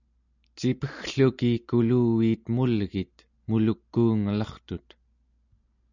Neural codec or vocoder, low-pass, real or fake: none; 7.2 kHz; real